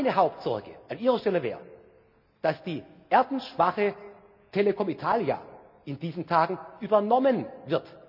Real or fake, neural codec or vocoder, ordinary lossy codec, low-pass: real; none; none; 5.4 kHz